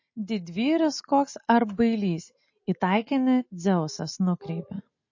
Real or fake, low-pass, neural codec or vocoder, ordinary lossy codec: real; 7.2 kHz; none; MP3, 32 kbps